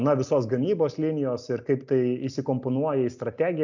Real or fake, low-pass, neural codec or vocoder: real; 7.2 kHz; none